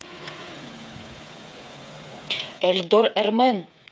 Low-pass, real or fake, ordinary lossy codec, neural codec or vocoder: none; fake; none; codec, 16 kHz, 8 kbps, FreqCodec, smaller model